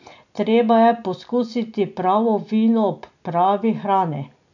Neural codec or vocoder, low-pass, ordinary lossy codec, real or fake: none; 7.2 kHz; none; real